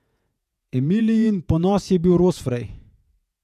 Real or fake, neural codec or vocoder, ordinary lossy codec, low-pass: fake; vocoder, 48 kHz, 128 mel bands, Vocos; none; 14.4 kHz